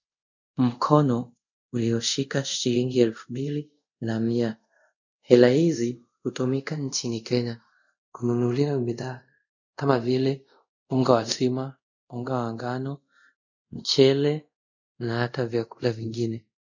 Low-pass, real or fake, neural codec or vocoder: 7.2 kHz; fake; codec, 24 kHz, 0.5 kbps, DualCodec